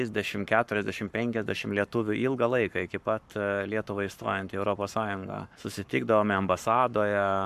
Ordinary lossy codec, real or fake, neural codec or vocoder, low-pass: MP3, 96 kbps; fake; codec, 44.1 kHz, 7.8 kbps, Pupu-Codec; 14.4 kHz